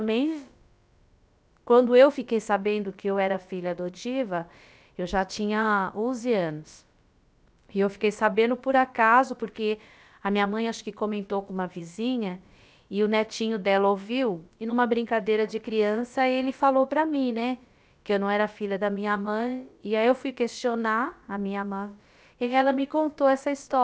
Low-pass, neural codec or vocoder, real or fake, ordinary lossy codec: none; codec, 16 kHz, about 1 kbps, DyCAST, with the encoder's durations; fake; none